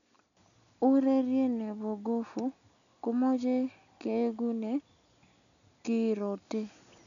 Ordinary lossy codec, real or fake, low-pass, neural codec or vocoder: none; real; 7.2 kHz; none